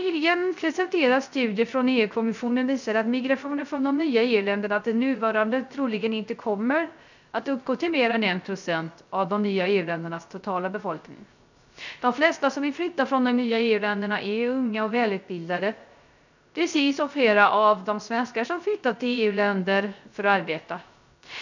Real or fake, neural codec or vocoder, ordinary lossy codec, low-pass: fake; codec, 16 kHz, 0.3 kbps, FocalCodec; none; 7.2 kHz